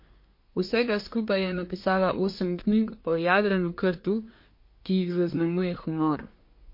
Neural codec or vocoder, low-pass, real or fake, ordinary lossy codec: codec, 24 kHz, 1 kbps, SNAC; 5.4 kHz; fake; MP3, 32 kbps